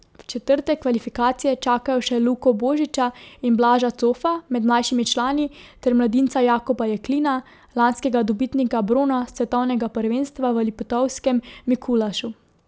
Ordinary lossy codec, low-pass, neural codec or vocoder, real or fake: none; none; none; real